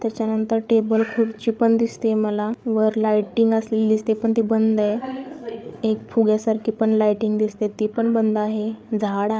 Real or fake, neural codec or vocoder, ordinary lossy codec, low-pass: fake; codec, 16 kHz, 8 kbps, FreqCodec, larger model; none; none